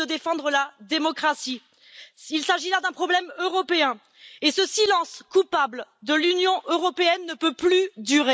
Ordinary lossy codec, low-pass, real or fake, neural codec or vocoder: none; none; real; none